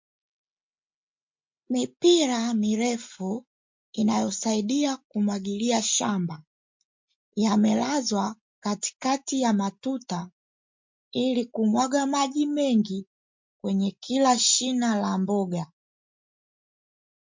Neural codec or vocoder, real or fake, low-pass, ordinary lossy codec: none; real; 7.2 kHz; MP3, 48 kbps